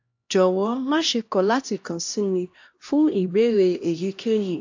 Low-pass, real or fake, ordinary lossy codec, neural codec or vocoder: 7.2 kHz; fake; AAC, 48 kbps; codec, 16 kHz, 1 kbps, X-Codec, HuBERT features, trained on LibriSpeech